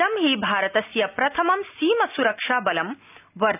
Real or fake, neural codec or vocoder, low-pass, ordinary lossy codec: real; none; 3.6 kHz; none